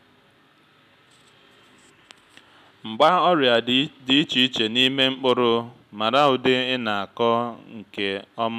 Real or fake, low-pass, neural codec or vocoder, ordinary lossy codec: real; 14.4 kHz; none; none